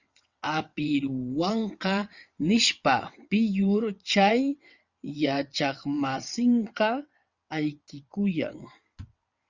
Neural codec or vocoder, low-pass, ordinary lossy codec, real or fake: vocoder, 22.05 kHz, 80 mel bands, WaveNeXt; 7.2 kHz; Opus, 64 kbps; fake